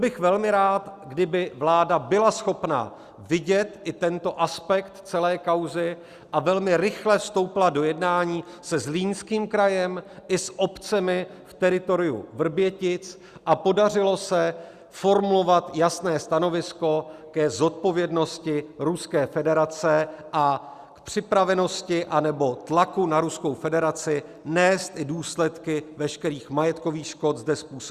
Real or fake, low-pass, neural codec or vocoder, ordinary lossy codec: real; 14.4 kHz; none; Opus, 64 kbps